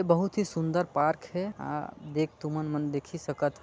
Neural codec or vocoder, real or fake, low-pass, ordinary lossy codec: none; real; none; none